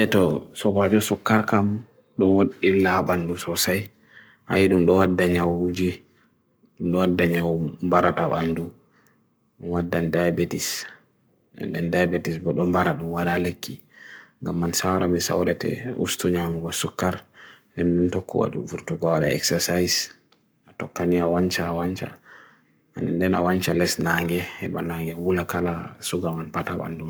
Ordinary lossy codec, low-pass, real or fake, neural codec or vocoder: none; none; fake; codec, 44.1 kHz, 7.8 kbps, Pupu-Codec